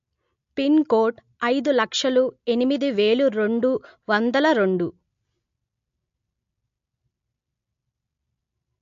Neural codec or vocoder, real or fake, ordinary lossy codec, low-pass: none; real; MP3, 48 kbps; 7.2 kHz